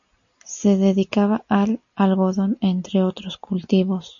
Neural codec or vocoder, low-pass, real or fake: none; 7.2 kHz; real